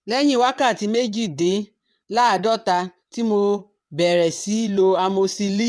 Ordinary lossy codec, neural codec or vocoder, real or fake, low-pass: none; vocoder, 22.05 kHz, 80 mel bands, WaveNeXt; fake; none